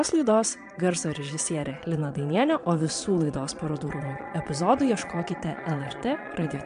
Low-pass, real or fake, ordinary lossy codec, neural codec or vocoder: 9.9 kHz; fake; MP3, 64 kbps; vocoder, 22.05 kHz, 80 mel bands, Vocos